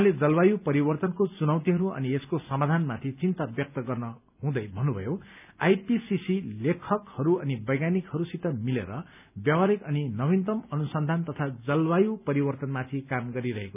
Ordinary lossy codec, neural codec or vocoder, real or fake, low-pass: none; none; real; 3.6 kHz